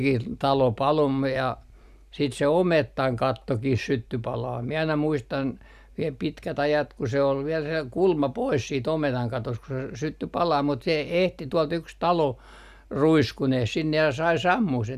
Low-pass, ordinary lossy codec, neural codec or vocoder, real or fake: 14.4 kHz; none; none; real